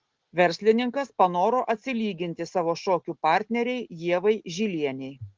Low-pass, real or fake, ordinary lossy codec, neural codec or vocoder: 7.2 kHz; real; Opus, 16 kbps; none